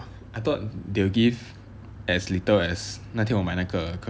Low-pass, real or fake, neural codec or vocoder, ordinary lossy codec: none; real; none; none